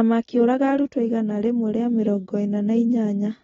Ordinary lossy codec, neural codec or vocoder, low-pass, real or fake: AAC, 24 kbps; none; 7.2 kHz; real